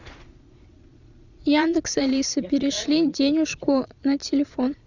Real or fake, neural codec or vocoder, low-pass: fake; vocoder, 22.05 kHz, 80 mel bands, Vocos; 7.2 kHz